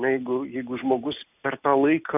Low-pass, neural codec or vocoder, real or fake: 3.6 kHz; none; real